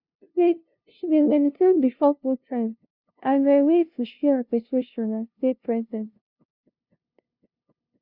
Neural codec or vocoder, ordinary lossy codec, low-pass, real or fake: codec, 16 kHz, 0.5 kbps, FunCodec, trained on LibriTTS, 25 frames a second; none; 5.4 kHz; fake